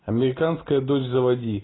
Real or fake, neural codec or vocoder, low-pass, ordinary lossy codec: real; none; 7.2 kHz; AAC, 16 kbps